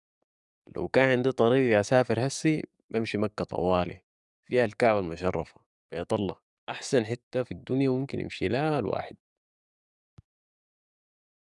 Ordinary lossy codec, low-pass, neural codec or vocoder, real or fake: none; 10.8 kHz; codec, 44.1 kHz, 7.8 kbps, DAC; fake